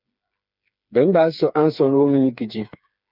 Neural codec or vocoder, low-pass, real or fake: codec, 16 kHz, 4 kbps, FreqCodec, smaller model; 5.4 kHz; fake